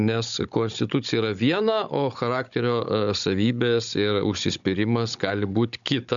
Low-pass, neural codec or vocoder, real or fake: 7.2 kHz; codec, 16 kHz, 16 kbps, FunCodec, trained on Chinese and English, 50 frames a second; fake